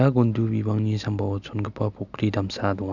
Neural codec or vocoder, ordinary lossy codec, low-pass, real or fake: none; none; 7.2 kHz; real